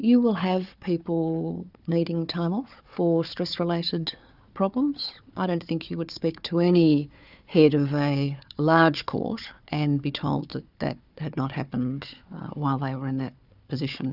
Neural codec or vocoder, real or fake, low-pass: codec, 16 kHz, 4 kbps, FunCodec, trained on Chinese and English, 50 frames a second; fake; 5.4 kHz